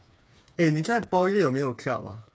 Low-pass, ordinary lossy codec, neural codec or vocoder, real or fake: none; none; codec, 16 kHz, 4 kbps, FreqCodec, smaller model; fake